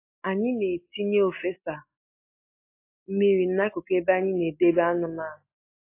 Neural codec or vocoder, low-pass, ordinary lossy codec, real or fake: none; 3.6 kHz; AAC, 24 kbps; real